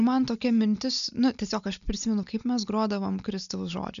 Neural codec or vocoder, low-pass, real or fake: none; 7.2 kHz; real